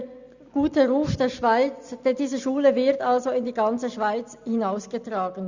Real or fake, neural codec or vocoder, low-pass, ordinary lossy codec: real; none; 7.2 kHz; Opus, 64 kbps